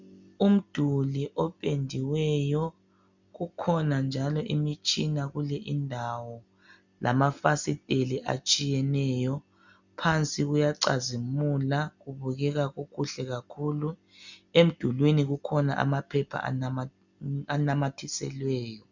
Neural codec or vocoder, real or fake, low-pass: none; real; 7.2 kHz